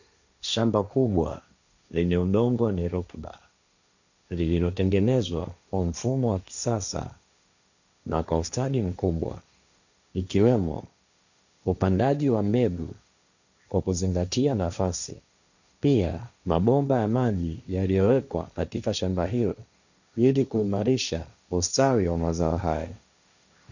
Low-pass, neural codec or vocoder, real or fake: 7.2 kHz; codec, 16 kHz, 1.1 kbps, Voila-Tokenizer; fake